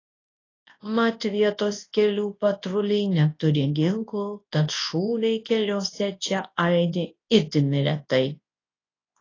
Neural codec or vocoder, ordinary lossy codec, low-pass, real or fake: codec, 24 kHz, 0.9 kbps, WavTokenizer, large speech release; AAC, 32 kbps; 7.2 kHz; fake